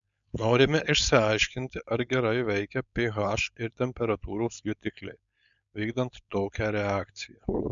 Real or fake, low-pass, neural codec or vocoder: fake; 7.2 kHz; codec, 16 kHz, 4.8 kbps, FACodec